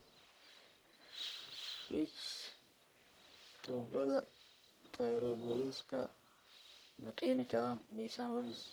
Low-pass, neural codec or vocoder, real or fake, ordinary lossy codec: none; codec, 44.1 kHz, 1.7 kbps, Pupu-Codec; fake; none